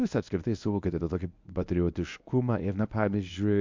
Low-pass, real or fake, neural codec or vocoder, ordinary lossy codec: 7.2 kHz; fake; codec, 24 kHz, 0.9 kbps, WavTokenizer, medium speech release version 1; MP3, 64 kbps